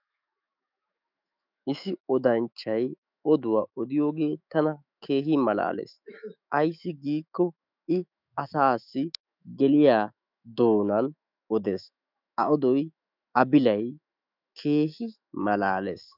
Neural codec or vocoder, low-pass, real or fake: autoencoder, 48 kHz, 128 numbers a frame, DAC-VAE, trained on Japanese speech; 5.4 kHz; fake